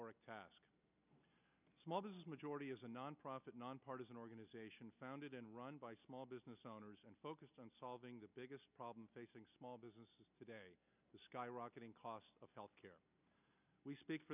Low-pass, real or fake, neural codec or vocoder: 3.6 kHz; real; none